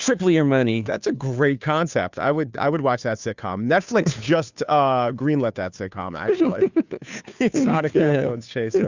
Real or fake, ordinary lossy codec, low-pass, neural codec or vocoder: fake; Opus, 64 kbps; 7.2 kHz; codec, 16 kHz, 2 kbps, FunCodec, trained on Chinese and English, 25 frames a second